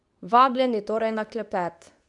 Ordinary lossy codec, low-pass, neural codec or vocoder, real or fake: none; 10.8 kHz; codec, 24 kHz, 0.9 kbps, WavTokenizer, medium speech release version 2; fake